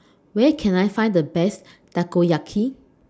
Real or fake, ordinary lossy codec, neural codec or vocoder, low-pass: real; none; none; none